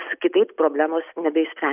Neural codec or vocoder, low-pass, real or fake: none; 3.6 kHz; real